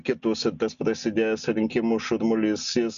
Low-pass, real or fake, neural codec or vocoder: 7.2 kHz; real; none